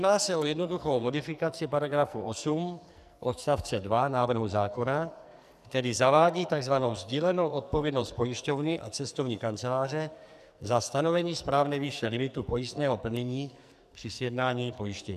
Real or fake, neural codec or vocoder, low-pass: fake; codec, 44.1 kHz, 2.6 kbps, SNAC; 14.4 kHz